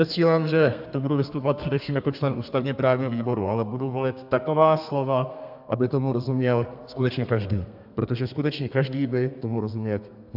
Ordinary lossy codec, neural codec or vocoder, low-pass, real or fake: AAC, 48 kbps; codec, 32 kHz, 1.9 kbps, SNAC; 5.4 kHz; fake